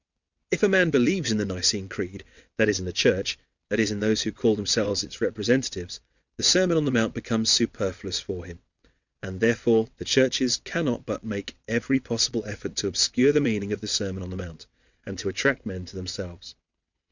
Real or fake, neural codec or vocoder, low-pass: fake; vocoder, 22.05 kHz, 80 mel bands, WaveNeXt; 7.2 kHz